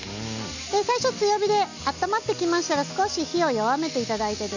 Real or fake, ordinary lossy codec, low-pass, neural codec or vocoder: real; none; 7.2 kHz; none